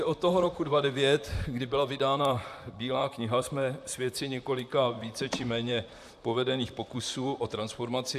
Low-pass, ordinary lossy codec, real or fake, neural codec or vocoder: 14.4 kHz; AAC, 96 kbps; fake; vocoder, 44.1 kHz, 128 mel bands, Pupu-Vocoder